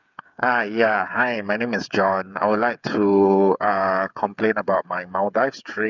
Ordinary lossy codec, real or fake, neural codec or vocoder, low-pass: none; fake; codec, 16 kHz, 8 kbps, FreqCodec, smaller model; 7.2 kHz